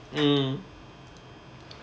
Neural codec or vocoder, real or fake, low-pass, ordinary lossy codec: none; real; none; none